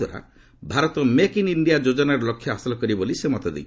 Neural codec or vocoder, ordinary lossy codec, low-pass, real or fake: none; none; none; real